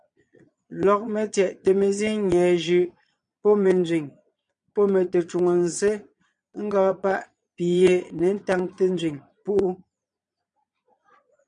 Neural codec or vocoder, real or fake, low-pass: vocoder, 22.05 kHz, 80 mel bands, Vocos; fake; 9.9 kHz